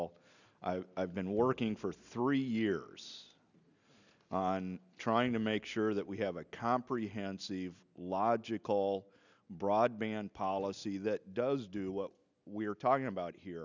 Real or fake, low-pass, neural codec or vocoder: real; 7.2 kHz; none